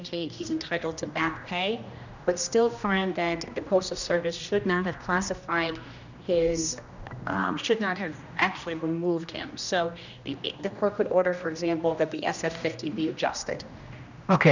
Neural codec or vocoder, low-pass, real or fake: codec, 16 kHz, 1 kbps, X-Codec, HuBERT features, trained on general audio; 7.2 kHz; fake